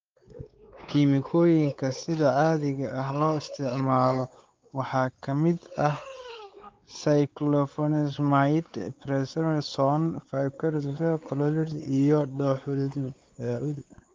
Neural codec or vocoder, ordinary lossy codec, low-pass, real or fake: codec, 16 kHz, 4 kbps, X-Codec, WavLM features, trained on Multilingual LibriSpeech; Opus, 24 kbps; 7.2 kHz; fake